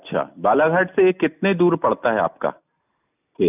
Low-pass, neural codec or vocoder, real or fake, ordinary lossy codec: 3.6 kHz; none; real; none